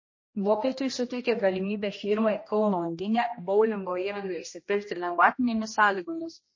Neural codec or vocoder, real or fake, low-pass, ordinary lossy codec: codec, 16 kHz, 1 kbps, X-Codec, HuBERT features, trained on general audio; fake; 7.2 kHz; MP3, 32 kbps